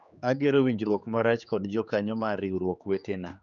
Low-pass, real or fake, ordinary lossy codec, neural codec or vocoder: 7.2 kHz; fake; none; codec, 16 kHz, 4 kbps, X-Codec, HuBERT features, trained on general audio